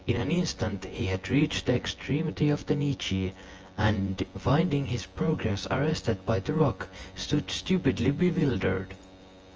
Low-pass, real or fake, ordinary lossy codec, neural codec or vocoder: 7.2 kHz; fake; Opus, 24 kbps; vocoder, 24 kHz, 100 mel bands, Vocos